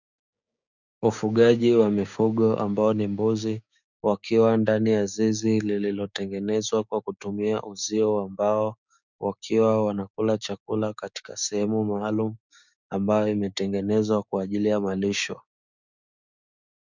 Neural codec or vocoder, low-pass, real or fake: codec, 16 kHz, 6 kbps, DAC; 7.2 kHz; fake